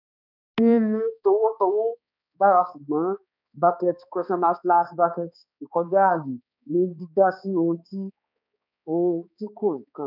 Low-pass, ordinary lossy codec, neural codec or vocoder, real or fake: 5.4 kHz; none; codec, 16 kHz, 2 kbps, X-Codec, HuBERT features, trained on balanced general audio; fake